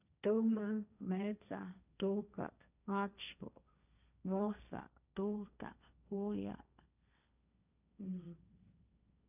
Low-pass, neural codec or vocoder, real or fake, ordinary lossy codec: 3.6 kHz; codec, 16 kHz, 1.1 kbps, Voila-Tokenizer; fake; none